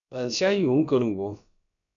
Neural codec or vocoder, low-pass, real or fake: codec, 16 kHz, about 1 kbps, DyCAST, with the encoder's durations; 7.2 kHz; fake